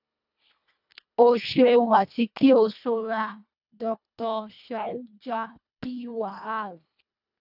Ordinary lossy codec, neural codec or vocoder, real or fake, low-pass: none; codec, 24 kHz, 1.5 kbps, HILCodec; fake; 5.4 kHz